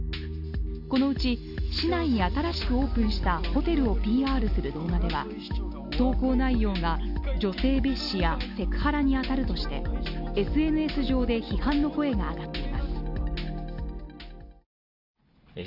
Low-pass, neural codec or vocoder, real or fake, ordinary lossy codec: 5.4 kHz; none; real; none